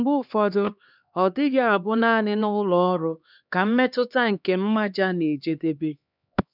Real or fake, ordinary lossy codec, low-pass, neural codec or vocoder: fake; none; 5.4 kHz; codec, 16 kHz, 2 kbps, X-Codec, HuBERT features, trained on LibriSpeech